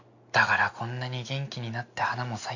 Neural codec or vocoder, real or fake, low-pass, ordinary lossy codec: none; real; 7.2 kHz; none